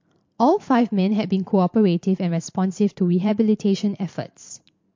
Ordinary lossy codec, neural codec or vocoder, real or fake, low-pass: MP3, 48 kbps; vocoder, 44.1 kHz, 128 mel bands every 512 samples, BigVGAN v2; fake; 7.2 kHz